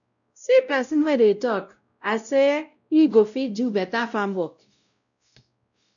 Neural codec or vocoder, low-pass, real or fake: codec, 16 kHz, 0.5 kbps, X-Codec, WavLM features, trained on Multilingual LibriSpeech; 7.2 kHz; fake